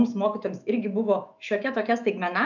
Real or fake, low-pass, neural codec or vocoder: fake; 7.2 kHz; autoencoder, 48 kHz, 128 numbers a frame, DAC-VAE, trained on Japanese speech